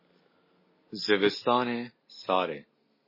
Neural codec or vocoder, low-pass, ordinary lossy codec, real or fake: none; 5.4 kHz; MP3, 24 kbps; real